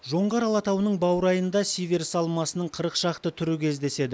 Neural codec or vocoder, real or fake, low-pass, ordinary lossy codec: none; real; none; none